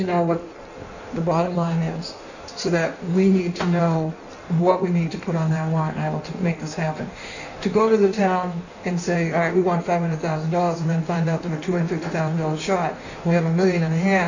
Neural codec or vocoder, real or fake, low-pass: codec, 16 kHz in and 24 kHz out, 1.1 kbps, FireRedTTS-2 codec; fake; 7.2 kHz